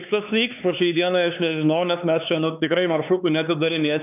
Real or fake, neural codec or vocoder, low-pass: fake; codec, 16 kHz, 4 kbps, X-Codec, HuBERT features, trained on LibriSpeech; 3.6 kHz